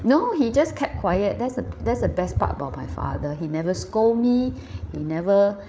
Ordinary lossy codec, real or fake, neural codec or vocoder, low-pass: none; fake; codec, 16 kHz, 8 kbps, FreqCodec, larger model; none